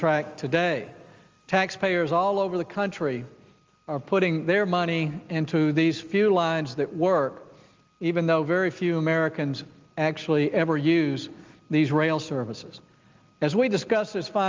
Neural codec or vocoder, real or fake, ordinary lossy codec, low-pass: none; real; Opus, 32 kbps; 7.2 kHz